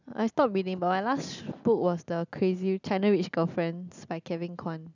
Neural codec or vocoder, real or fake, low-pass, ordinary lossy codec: none; real; 7.2 kHz; none